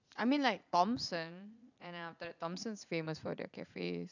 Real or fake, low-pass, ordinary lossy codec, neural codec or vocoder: fake; 7.2 kHz; none; vocoder, 44.1 kHz, 80 mel bands, Vocos